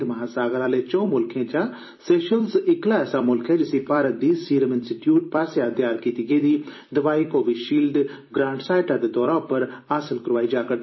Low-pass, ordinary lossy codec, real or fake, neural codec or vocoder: 7.2 kHz; MP3, 24 kbps; fake; vocoder, 44.1 kHz, 128 mel bands every 256 samples, BigVGAN v2